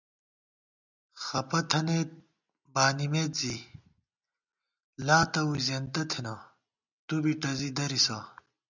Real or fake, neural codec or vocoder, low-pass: real; none; 7.2 kHz